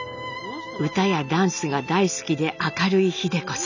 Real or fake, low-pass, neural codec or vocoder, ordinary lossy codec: real; 7.2 kHz; none; none